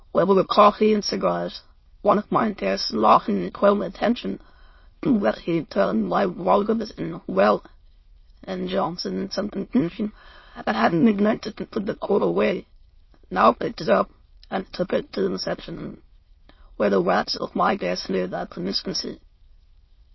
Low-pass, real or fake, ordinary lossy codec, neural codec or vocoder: 7.2 kHz; fake; MP3, 24 kbps; autoencoder, 22.05 kHz, a latent of 192 numbers a frame, VITS, trained on many speakers